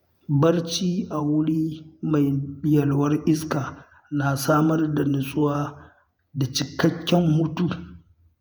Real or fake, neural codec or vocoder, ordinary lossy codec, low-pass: fake; vocoder, 48 kHz, 128 mel bands, Vocos; none; none